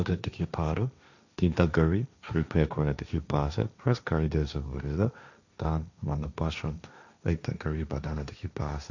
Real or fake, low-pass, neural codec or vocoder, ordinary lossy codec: fake; 7.2 kHz; codec, 16 kHz, 1.1 kbps, Voila-Tokenizer; none